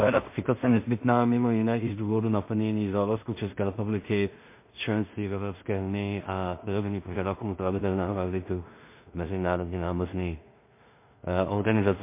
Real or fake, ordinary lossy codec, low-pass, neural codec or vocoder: fake; MP3, 24 kbps; 3.6 kHz; codec, 16 kHz in and 24 kHz out, 0.4 kbps, LongCat-Audio-Codec, two codebook decoder